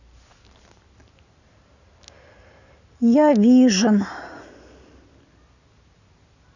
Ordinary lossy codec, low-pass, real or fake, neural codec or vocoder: none; 7.2 kHz; real; none